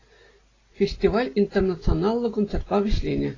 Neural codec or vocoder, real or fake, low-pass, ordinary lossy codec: none; real; 7.2 kHz; AAC, 32 kbps